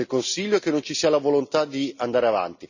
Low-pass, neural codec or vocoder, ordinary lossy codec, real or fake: 7.2 kHz; none; none; real